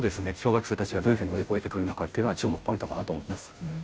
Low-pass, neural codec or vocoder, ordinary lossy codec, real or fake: none; codec, 16 kHz, 0.5 kbps, FunCodec, trained on Chinese and English, 25 frames a second; none; fake